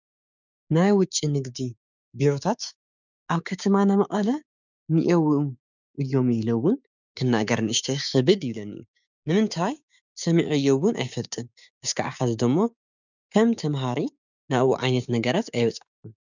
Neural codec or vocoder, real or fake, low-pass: codec, 16 kHz, 6 kbps, DAC; fake; 7.2 kHz